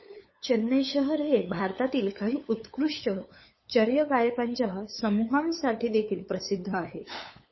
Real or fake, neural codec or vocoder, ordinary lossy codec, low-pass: fake; codec, 16 kHz, 8 kbps, FunCodec, trained on LibriTTS, 25 frames a second; MP3, 24 kbps; 7.2 kHz